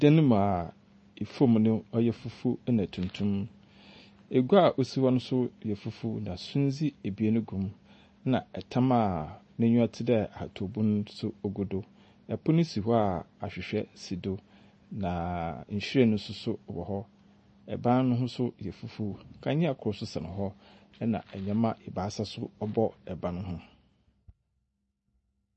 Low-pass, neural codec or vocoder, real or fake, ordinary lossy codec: 10.8 kHz; none; real; MP3, 32 kbps